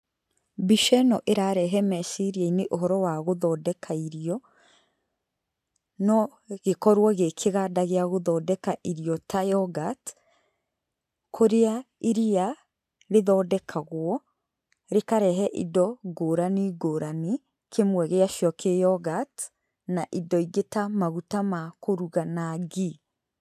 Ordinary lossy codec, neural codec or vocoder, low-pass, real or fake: none; none; 14.4 kHz; real